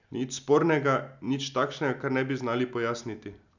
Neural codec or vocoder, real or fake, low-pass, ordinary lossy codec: none; real; 7.2 kHz; none